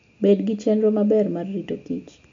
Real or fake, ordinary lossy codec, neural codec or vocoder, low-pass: real; AAC, 48 kbps; none; 7.2 kHz